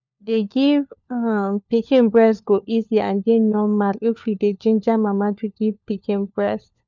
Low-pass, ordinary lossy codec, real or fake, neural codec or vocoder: 7.2 kHz; none; fake; codec, 16 kHz, 4 kbps, FunCodec, trained on LibriTTS, 50 frames a second